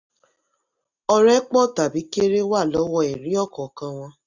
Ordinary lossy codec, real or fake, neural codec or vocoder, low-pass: none; real; none; 7.2 kHz